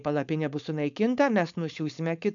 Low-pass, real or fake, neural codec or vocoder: 7.2 kHz; fake; codec, 16 kHz, 4.8 kbps, FACodec